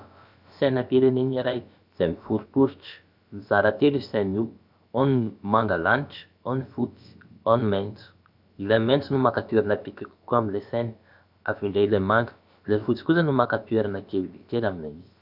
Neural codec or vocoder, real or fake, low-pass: codec, 16 kHz, about 1 kbps, DyCAST, with the encoder's durations; fake; 5.4 kHz